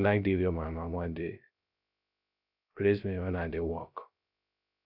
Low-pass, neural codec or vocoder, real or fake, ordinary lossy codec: 5.4 kHz; codec, 16 kHz, 0.3 kbps, FocalCodec; fake; none